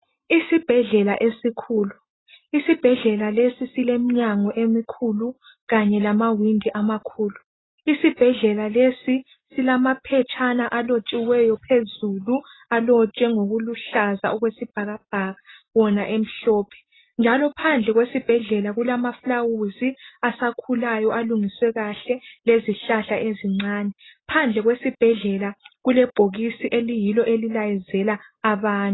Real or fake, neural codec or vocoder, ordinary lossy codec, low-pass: real; none; AAC, 16 kbps; 7.2 kHz